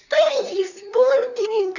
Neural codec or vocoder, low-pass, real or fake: codec, 24 kHz, 1 kbps, SNAC; 7.2 kHz; fake